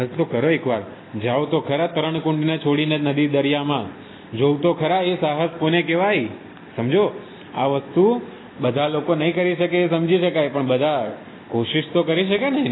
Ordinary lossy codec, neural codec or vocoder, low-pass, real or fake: AAC, 16 kbps; none; 7.2 kHz; real